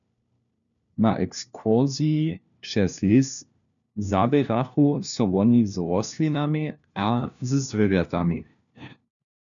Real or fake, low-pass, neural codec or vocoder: fake; 7.2 kHz; codec, 16 kHz, 1 kbps, FunCodec, trained on LibriTTS, 50 frames a second